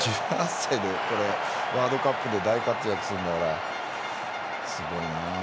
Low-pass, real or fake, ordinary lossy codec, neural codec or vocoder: none; real; none; none